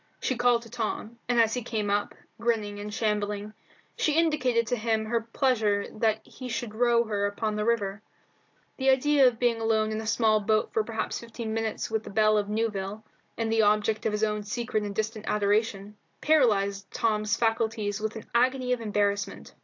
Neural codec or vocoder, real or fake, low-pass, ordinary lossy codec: none; real; 7.2 kHz; AAC, 48 kbps